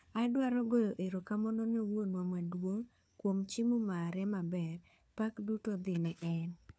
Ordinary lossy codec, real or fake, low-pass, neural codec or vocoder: none; fake; none; codec, 16 kHz, 4 kbps, FunCodec, trained on LibriTTS, 50 frames a second